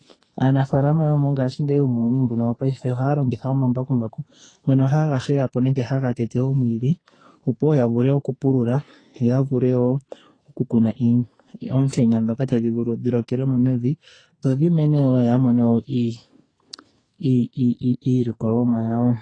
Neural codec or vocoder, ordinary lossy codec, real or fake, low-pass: codec, 44.1 kHz, 2.6 kbps, SNAC; AAC, 32 kbps; fake; 9.9 kHz